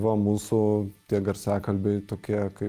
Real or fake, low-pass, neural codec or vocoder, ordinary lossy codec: real; 14.4 kHz; none; Opus, 32 kbps